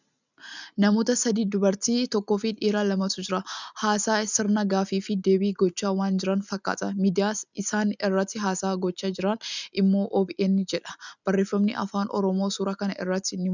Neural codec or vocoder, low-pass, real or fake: none; 7.2 kHz; real